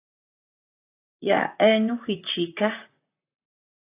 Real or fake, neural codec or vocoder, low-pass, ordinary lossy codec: fake; vocoder, 44.1 kHz, 128 mel bands, Pupu-Vocoder; 3.6 kHz; AAC, 16 kbps